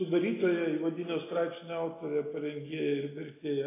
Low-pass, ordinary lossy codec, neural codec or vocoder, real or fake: 3.6 kHz; AAC, 24 kbps; none; real